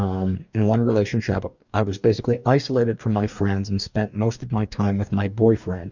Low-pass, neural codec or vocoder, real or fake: 7.2 kHz; codec, 44.1 kHz, 2.6 kbps, DAC; fake